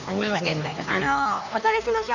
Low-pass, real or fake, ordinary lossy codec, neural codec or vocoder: 7.2 kHz; fake; none; codec, 16 kHz, 2 kbps, X-Codec, HuBERT features, trained on LibriSpeech